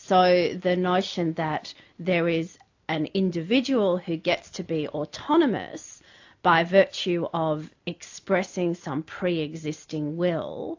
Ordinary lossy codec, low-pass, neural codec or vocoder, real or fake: AAC, 48 kbps; 7.2 kHz; none; real